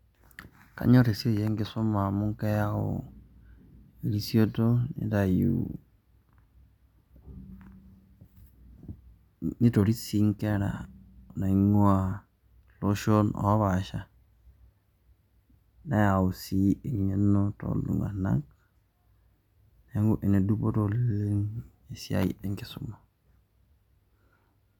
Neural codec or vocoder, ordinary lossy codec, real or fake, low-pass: none; none; real; 19.8 kHz